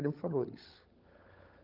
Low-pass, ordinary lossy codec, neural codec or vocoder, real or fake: 5.4 kHz; Opus, 24 kbps; codec, 16 kHz, 16 kbps, FunCodec, trained on LibriTTS, 50 frames a second; fake